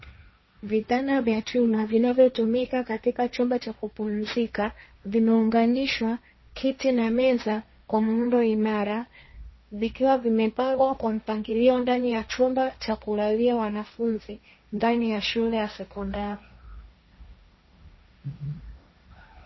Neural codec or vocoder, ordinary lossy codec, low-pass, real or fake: codec, 16 kHz, 1.1 kbps, Voila-Tokenizer; MP3, 24 kbps; 7.2 kHz; fake